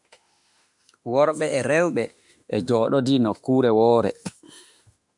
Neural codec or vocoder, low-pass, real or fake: autoencoder, 48 kHz, 32 numbers a frame, DAC-VAE, trained on Japanese speech; 10.8 kHz; fake